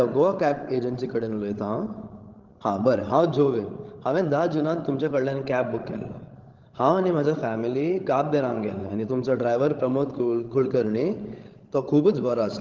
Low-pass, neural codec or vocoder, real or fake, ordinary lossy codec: 7.2 kHz; codec, 16 kHz, 16 kbps, FreqCodec, larger model; fake; Opus, 16 kbps